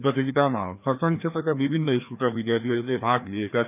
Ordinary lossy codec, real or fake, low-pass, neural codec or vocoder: none; fake; 3.6 kHz; codec, 16 kHz, 2 kbps, FreqCodec, larger model